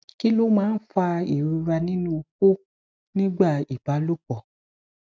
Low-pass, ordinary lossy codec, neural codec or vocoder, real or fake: none; none; none; real